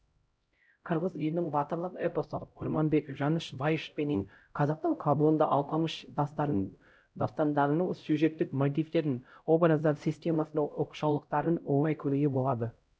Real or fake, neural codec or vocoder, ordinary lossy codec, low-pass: fake; codec, 16 kHz, 0.5 kbps, X-Codec, HuBERT features, trained on LibriSpeech; none; none